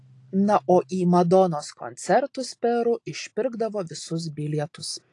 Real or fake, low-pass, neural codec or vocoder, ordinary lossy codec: real; 10.8 kHz; none; AAC, 48 kbps